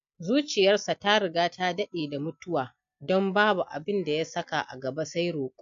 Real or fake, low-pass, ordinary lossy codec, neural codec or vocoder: real; 7.2 kHz; AAC, 64 kbps; none